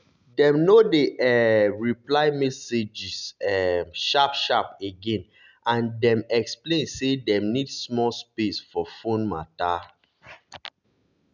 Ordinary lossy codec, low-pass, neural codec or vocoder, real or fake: none; 7.2 kHz; none; real